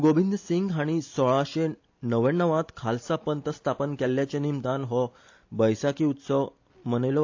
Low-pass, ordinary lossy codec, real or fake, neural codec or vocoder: 7.2 kHz; AAC, 48 kbps; real; none